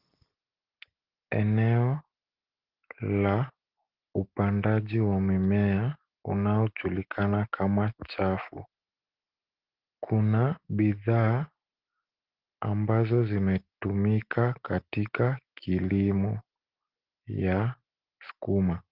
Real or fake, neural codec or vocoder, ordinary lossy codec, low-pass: real; none; Opus, 16 kbps; 5.4 kHz